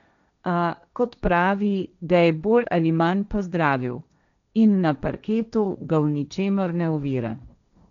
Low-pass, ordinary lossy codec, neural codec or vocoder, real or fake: 7.2 kHz; none; codec, 16 kHz, 1.1 kbps, Voila-Tokenizer; fake